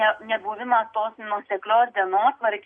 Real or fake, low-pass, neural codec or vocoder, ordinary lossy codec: real; 9.9 kHz; none; MP3, 48 kbps